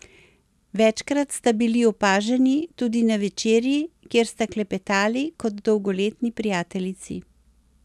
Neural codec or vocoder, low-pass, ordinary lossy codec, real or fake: none; none; none; real